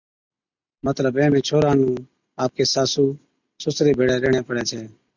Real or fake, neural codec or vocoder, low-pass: real; none; 7.2 kHz